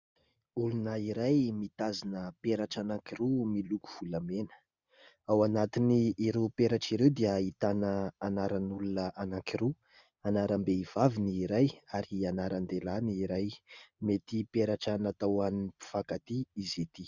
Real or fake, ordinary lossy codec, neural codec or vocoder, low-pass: real; Opus, 64 kbps; none; 7.2 kHz